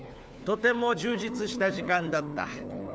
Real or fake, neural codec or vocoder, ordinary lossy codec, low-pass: fake; codec, 16 kHz, 4 kbps, FunCodec, trained on LibriTTS, 50 frames a second; none; none